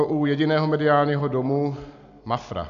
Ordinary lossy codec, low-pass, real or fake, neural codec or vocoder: AAC, 64 kbps; 7.2 kHz; real; none